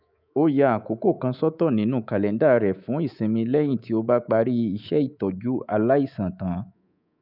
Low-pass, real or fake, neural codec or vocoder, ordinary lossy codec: 5.4 kHz; fake; codec, 24 kHz, 3.1 kbps, DualCodec; none